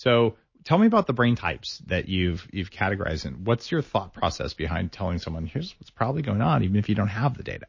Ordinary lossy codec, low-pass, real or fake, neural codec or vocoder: MP3, 32 kbps; 7.2 kHz; real; none